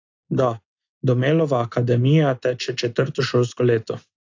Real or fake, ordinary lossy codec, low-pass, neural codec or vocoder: real; AAC, 48 kbps; 7.2 kHz; none